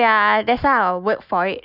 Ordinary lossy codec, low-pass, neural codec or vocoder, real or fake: none; 5.4 kHz; none; real